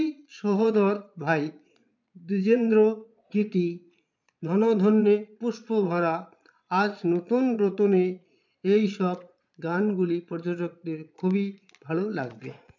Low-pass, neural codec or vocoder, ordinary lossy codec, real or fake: 7.2 kHz; vocoder, 22.05 kHz, 80 mel bands, Vocos; none; fake